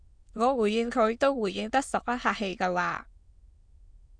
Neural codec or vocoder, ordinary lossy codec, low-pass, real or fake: autoencoder, 22.05 kHz, a latent of 192 numbers a frame, VITS, trained on many speakers; Opus, 64 kbps; 9.9 kHz; fake